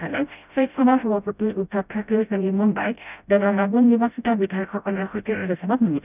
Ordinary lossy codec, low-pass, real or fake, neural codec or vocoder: none; 3.6 kHz; fake; codec, 16 kHz, 0.5 kbps, FreqCodec, smaller model